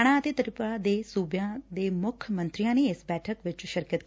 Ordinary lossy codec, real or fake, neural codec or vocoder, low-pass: none; real; none; none